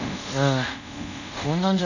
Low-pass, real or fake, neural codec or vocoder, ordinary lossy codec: 7.2 kHz; fake; codec, 24 kHz, 0.5 kbps, DualCodec; none